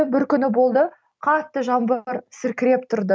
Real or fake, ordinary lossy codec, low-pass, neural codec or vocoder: real; none; none; none